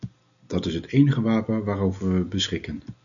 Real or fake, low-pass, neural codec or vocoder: real; 7.2 kHz; none